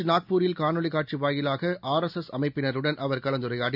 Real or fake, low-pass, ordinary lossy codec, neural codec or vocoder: real; 5.4 kHz; none; none